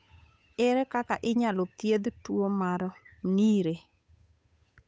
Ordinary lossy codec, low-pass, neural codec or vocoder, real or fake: none; none; codec, 16 kHz, 8 kbps, FunCodec, trained on Chinese and English, 25 frames a second; fake